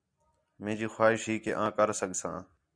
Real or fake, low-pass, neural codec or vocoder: real; 9.9 kHz; none